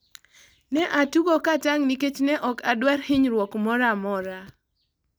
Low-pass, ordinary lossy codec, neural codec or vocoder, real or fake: none; none; none; real